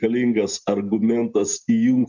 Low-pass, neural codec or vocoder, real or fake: 7.2 kHz; none; real